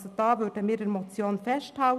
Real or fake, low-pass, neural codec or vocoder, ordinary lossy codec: real; 14.4 kHz; none; none